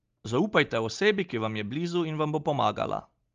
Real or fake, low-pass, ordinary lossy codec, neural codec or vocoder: real; 7.2 kHz; Opus, 32 kbps; none